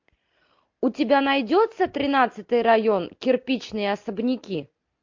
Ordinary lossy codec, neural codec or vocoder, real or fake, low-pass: MP3, 48 kbps; none; real; 7.2 kHz